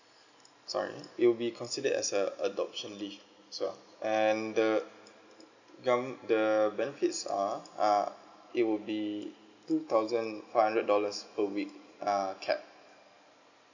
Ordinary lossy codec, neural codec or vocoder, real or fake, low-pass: none; none; real; 7.2 kHz